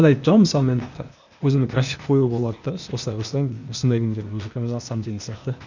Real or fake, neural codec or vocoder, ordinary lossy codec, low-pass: fake; codec, 16 kHz, 0.8 kbps, ZipCodec; none; 7.2 kHz